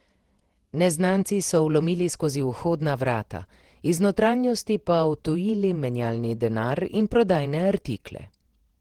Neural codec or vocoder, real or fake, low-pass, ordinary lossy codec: vocoder, 48 kHz, 128 mel bands, Vocos; fake; 19.8 kHz; Opus, 16 kbps